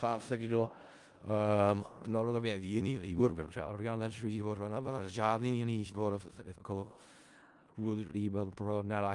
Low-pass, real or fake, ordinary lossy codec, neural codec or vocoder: 10.8 kHz; fake; Opus, 32 kbps; codec, 16 kHz in and 24 kHz out, 0.4 kbps, LongCat-Audio-Codec, four codebook decoder